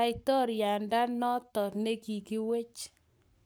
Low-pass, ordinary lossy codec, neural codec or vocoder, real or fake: none; none; codec, 44.1 kHz, 7.8 kbps, Pupu-Codec; fake